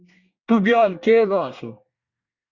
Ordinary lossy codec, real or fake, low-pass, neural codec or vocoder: Opus, 64 kbps; fake; 7.2 kHz; codec, 24 kHz, 1 kbps, SNAC